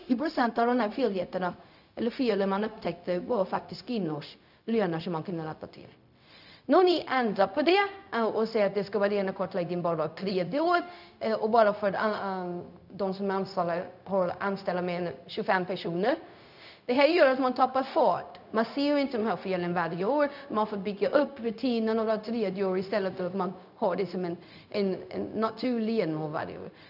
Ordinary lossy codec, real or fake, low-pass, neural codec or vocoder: none; fake; 5.4 kHz; codec, 16 kHz, 0.4 kbps, LongCat-Audio-Codec